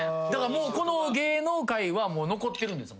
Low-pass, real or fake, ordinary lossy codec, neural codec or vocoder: none; real; none; none